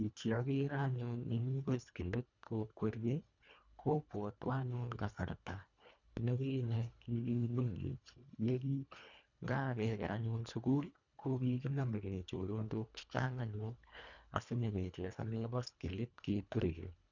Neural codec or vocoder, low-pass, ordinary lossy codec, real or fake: codec, 24 kHz, 1.5 kbps, HILCodec; 7.2 kHz; none; fake